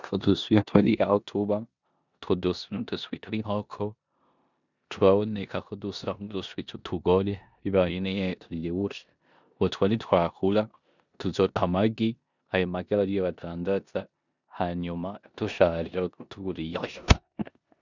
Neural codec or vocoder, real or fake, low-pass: codec, 16 kHz in and 24 kHz out, 0.9 kbps, LongCat-Audio-Codec, four codebook decoder; fake; 7.2 kHz